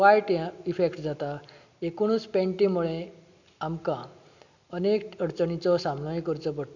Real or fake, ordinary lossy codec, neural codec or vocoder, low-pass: real; none; none; 7.2 kHz